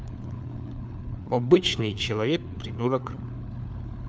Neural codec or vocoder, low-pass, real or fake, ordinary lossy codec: codec, 16 kHz, 4 kbps, FunCodec, trained on LibriTTS, 50 frames a second; none; fake; none